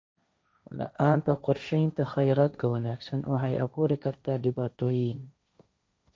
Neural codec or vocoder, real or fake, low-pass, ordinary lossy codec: codec, 16 kHz, 1.1 kbps, Voila-Tokenizer; fake; none; none